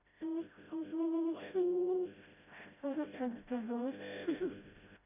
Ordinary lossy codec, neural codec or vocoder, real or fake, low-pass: none; codec, 16 kHz, 0.5 kbps, FreqCodec, smaller model; fake; 3.6 kHz